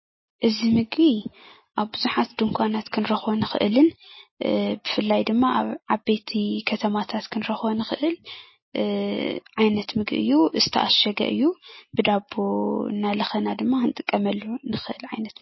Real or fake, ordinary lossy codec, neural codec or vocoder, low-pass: real; MP3, 24 kbps; none; 7.2 kHz